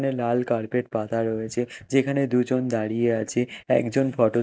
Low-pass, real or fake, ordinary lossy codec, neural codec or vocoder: none; real; none; none